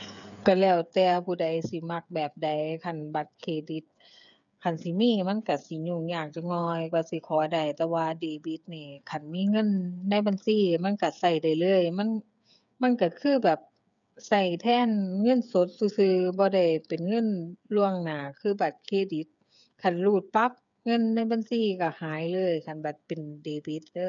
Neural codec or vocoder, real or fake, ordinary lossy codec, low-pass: codec, 16 kHz, 8 kbps, FreqCodec, smaller model; fake; none; 7.2 kHz